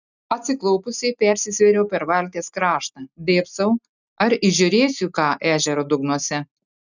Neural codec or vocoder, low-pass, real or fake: none; 7.2 kHz; real